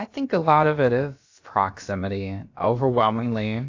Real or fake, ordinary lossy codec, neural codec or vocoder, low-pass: fake; AAC, 48 kbps; codec, 16 kHz, about 1 kbps, DyCAST, with the encoder's durations; 7.2 kHz